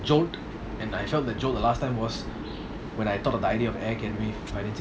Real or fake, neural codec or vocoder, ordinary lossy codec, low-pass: real; none; none; none